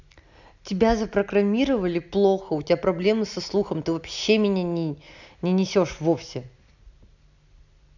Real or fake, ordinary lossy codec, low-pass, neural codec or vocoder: real; none; 7.2 kHz; none